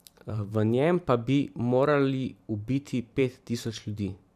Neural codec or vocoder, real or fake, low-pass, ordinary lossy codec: vocoder, 44.1 kHz, 128 mel bands every 512 samples, BigVGAN v2; fake; 14.4 kHz; none